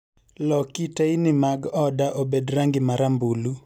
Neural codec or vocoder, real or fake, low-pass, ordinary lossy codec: none; real; 19.8 kHz; none